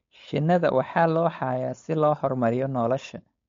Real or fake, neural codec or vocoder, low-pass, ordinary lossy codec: fake; codec, 16 kHz, 4.8 kbps, FACodec; 7.2 kHz; MP3, 48 kbps